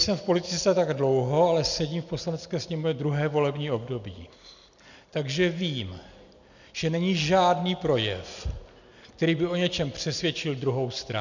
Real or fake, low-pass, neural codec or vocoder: real; 7.2 kHz; none